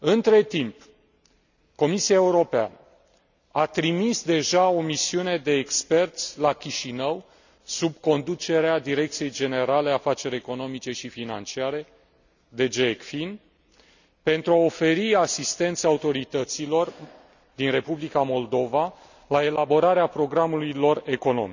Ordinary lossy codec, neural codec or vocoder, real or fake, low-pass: none; none; real; 7.2 kHz